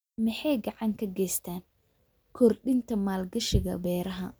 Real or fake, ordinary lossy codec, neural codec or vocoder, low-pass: real; none; none; none